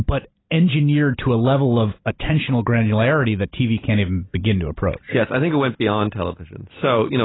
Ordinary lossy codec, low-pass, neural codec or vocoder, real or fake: AAC, 16 kbps; 7.2 kHz; vocoder, 44.1 kHz, 128 mel bands every 256 samples, BigVGAN v2; fake